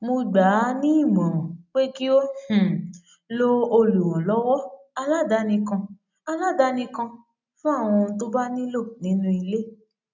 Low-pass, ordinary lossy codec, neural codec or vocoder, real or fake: 7.2 kHz; none; none; real